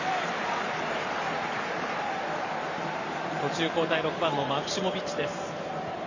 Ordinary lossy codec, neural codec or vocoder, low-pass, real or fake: none; vocoder, 44.1 kHz, 128 mel bands every 512 samples, BigVGAN v2; 7.2 kHz; fake